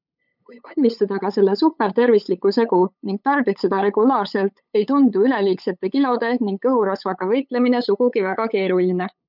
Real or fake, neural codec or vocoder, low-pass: fake; codec, 16 kHz, 8 kbps, FunCodec, trained on LibriTTS, 25 frames a second; 5.4 kHz